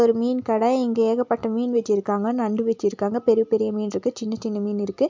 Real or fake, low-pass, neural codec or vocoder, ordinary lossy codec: real; 7.2 kHz; none; MP3, 64 kbps